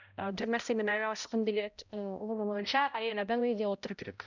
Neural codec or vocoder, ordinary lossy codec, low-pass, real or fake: codec, 16 kHz, 0.5 kbps, X-Codec, HuBERT features, trained on balanced general audio; none; 7.2 kHz; fake